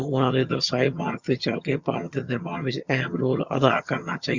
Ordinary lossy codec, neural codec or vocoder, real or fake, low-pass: Opus, 64 kbps; vocoder, 22.05 kHz, 80 mel bands, HiFi-GAN; fake; 7.2 kHz